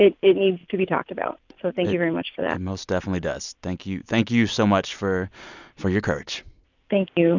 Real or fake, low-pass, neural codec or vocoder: real; 7.2 kHz; none